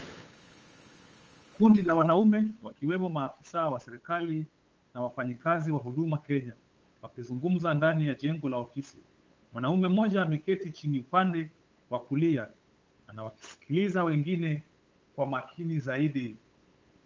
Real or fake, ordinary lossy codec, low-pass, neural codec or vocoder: fake; Opus, 24 kbps; 7.2 kHz; codec, 16 kHz, 8 kbps, FunCodec, trained on LibriTTS, 25 frames a second